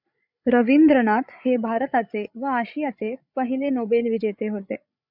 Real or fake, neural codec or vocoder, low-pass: fake; codec, 16 kHz, 16 kbps, FreqCodec, larger model; 5.4 kHz